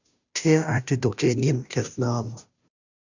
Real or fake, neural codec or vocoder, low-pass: fake; codec, 16 kHz, 0.5 kbps, FunCodec, trained on Chinese and English, 25 frames a second; 7.2 kHz